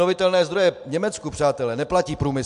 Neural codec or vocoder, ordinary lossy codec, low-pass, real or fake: none; MP3, 64 kbps; 10.8 kHz; real